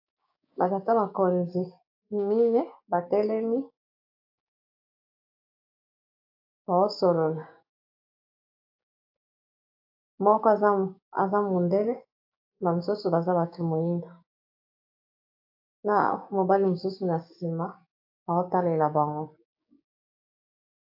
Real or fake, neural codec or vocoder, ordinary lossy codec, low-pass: fake; codec, 16 kHz, 6 kbps, DAC; AAC, 48 kbps; 5.4 kHz